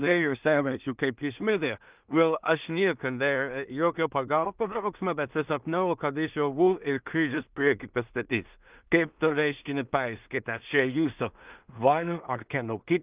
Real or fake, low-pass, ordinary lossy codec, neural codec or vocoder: fake; 3.6 kHz; Opus, 24 kbps; codec, 16 kHz in and 24 kHz out, 0.4 kbps, LongCat-Audio-Codec, two codebook decoder